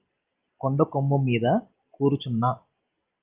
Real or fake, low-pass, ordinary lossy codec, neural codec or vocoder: real; 3.6 kHz; Opus, 24 kbps; none